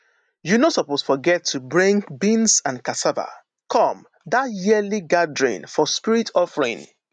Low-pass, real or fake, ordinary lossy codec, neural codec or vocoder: 9.9 kHz; real; none; none